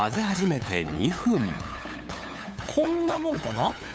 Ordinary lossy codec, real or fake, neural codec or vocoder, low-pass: none; fake; codec, 16 kHz, 8 kbps, FunCodec, trained on LibriTTS, 25 frames a second; none